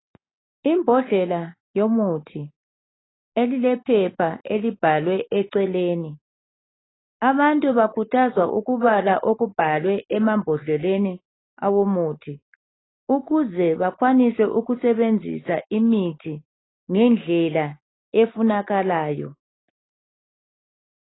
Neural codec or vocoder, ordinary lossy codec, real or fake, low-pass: none; AAC, 16 kbps; real; 7.2 kHz